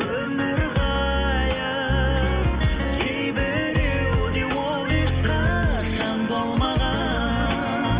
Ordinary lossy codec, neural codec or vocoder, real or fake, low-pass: Opus, 32 kbps; none; real; 3.6 kHz